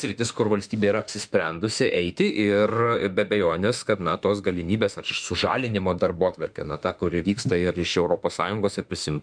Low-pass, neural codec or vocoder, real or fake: 9.9 kHz; autoencoder, 48 kHz, 32 numbers a frame, DAC-VAE, trained on Japanese speech; fake